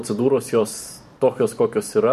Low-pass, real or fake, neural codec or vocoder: 14.4 kHz; fake; vocoder, 44.1 kHz, 128 mel bands every 512 samples, BigVGAN v2